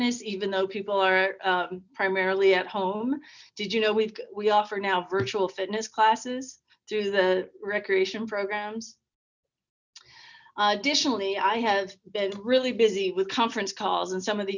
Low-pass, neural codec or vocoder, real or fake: 7.2 kHz; none; real